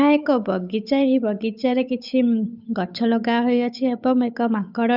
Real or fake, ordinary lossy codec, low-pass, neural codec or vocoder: fake; none; 5.4 kHz; codec, 16 kHz, 8 kbps, FunCodec, trained on Chinese and English, 25 frames a second